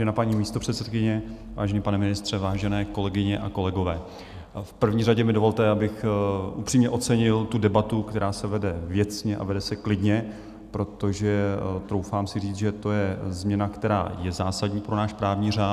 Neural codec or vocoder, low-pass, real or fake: none; 14.4 kHz; real